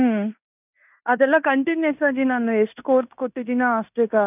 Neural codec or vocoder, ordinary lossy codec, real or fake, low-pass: codec, 16 kHz in and 24 kHz out, 0.9 kbps, LongCat-Audio-Codec, fine tuned four codebook decoder; none; fake; 3.6 kHz